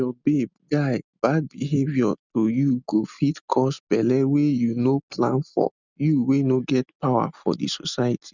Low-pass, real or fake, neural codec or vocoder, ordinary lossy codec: 7.2 kHz; real; none; none